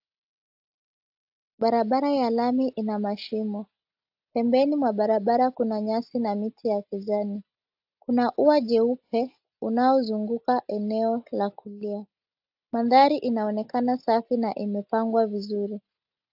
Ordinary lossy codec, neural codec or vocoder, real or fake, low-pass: AAC, 48 kbps; none; real; 5.4 kHz